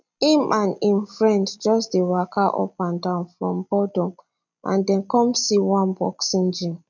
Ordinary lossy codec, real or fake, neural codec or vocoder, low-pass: none; real; none; 7.2 kHz